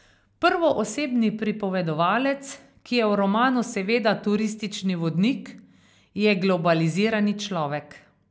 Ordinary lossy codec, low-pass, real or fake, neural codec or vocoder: none; none; real; none